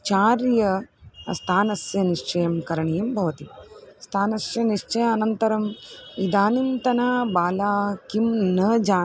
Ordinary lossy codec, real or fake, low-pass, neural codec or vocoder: none; real; none; none